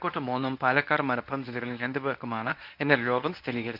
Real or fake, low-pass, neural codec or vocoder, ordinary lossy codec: fake; 5.4 kHz; codec, 24 kHz, 0.9 kbps, WavTokenizer, medium speech release version 1; none